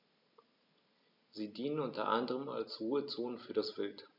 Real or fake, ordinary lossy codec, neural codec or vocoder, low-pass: real; none; none; 5.4 kHz